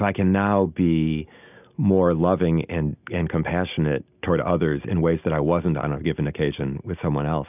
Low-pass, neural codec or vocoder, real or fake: 3.6 kHz; none; real